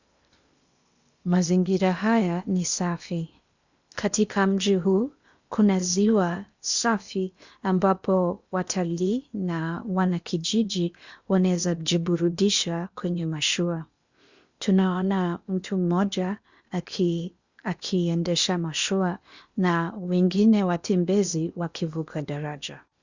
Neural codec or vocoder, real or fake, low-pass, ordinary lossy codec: codec, 16 kHz in and 24 kHz out, 0.8 kbps, FocalCodec, streaming, 65536 codes; fake; 7.2 kHz; Opus, 64 kbps